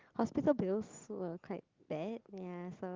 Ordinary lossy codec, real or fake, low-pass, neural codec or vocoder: Opus, 32 kbps; real; 7.2 kHz; none